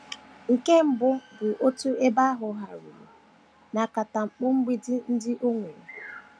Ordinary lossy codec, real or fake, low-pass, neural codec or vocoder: none; real; none; none